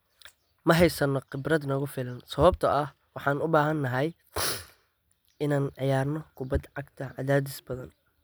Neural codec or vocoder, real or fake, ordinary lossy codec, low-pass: none; real; none; none